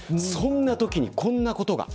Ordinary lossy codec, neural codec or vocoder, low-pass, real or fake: none; none; none; real